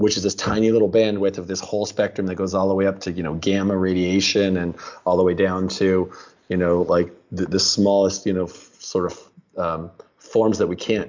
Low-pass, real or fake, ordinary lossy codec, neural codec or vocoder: 7.2 kHz; real; MP3, 64 kbps; none